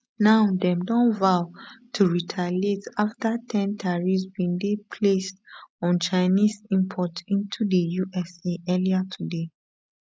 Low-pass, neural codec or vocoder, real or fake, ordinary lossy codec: none; none; real; none